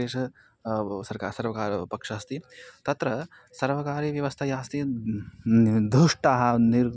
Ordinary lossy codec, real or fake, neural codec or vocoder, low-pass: none; real; none; none